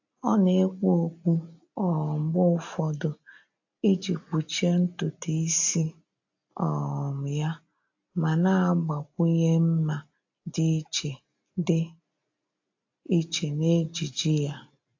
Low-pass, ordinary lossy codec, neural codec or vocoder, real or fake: 7.2 kHz; AAC, 48 kbps; none; real